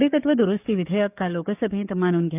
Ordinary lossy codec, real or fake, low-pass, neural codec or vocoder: none; fake; 3.6 kHz; codec, 44.1 kHz, 7.8 kbps, DAC